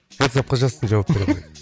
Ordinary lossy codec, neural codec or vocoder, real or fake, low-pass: none; none; real; none